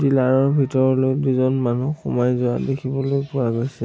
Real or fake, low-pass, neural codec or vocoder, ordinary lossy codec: real; none; none; none